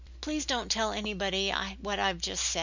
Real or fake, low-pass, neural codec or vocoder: real; 7.2 kHz; none